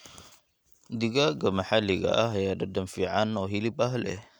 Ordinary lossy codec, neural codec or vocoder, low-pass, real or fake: none; none; none; real